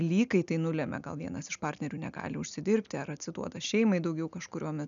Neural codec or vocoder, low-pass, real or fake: none; 7.2 kHz; real